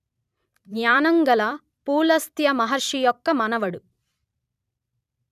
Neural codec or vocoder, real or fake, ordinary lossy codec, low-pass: vocoder, 44.1 kHz, 128 mel bands every 256 samples, BigVGAN v2; fake; none; 14.4 kHz